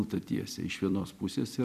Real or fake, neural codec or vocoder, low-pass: fake; vocoder, 44.1 kHz, 128 mel bands every 256 samples, BigVGAN v2; 14.4 kHz